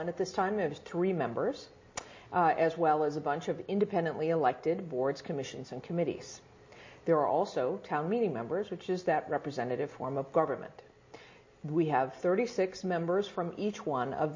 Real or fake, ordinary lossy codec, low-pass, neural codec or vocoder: real; MP3, 32 kbps; 7.2 kHz; none